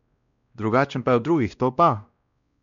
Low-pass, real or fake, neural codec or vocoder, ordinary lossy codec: 7.2 kHz; fake; codec, 16 kHz, 1 kbps, X-Codec, WavLM features, trained on Multilingual LibriSpeech; none